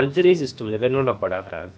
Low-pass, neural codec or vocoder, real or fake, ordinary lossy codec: none; codec, 16 kHz, about 1 kbps, DyCAST, with the encoder's durations; fake; none